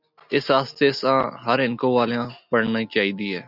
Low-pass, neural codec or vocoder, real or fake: 5.4 kHz; none; real